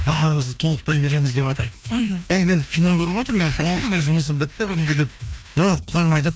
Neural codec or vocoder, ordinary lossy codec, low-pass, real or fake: codec, 16 kHz, 1 kbps, FreqCodec, larger model; none; none; fake